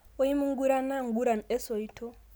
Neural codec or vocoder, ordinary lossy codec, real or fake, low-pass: none; none; real; none